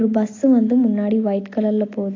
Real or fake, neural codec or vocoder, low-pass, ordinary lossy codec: real; none; 7.2 kHz; AAC, 32 kbps